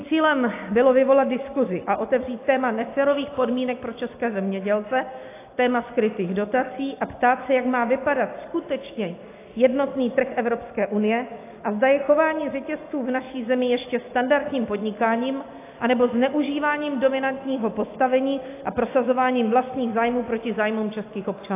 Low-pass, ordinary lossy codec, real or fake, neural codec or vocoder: 3.6 kHz; AAC, 24 kbps; real; none